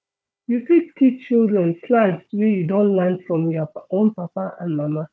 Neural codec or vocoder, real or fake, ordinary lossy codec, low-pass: codec, 16 kHz, 4 kbps, FunCodec, trained on Chinese and English, 50 frames a second; fake; none; none